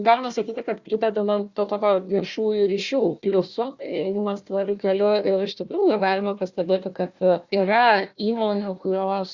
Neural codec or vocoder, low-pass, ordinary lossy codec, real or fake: codec, 16 kHz, 1 kbps, FunCodec, trained on Chinese and English, 50 frames a second; 7.2 kHz; Opus, 64 kbps; fake